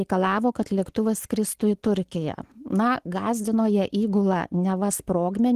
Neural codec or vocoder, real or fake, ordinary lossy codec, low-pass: none; real; Opus, 16 kbps; 14.4 kHz